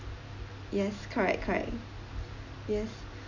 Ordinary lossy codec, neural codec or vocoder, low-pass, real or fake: none; none; 7.2 kHz; real